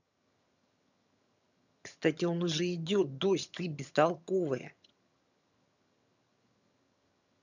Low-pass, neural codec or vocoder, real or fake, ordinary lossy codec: 7.2 kHz; vocoder, 22.05 kHz, 80 mel bands, HiFi-GAN; fake; none